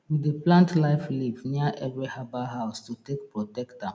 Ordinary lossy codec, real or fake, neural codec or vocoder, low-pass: none; real; none; none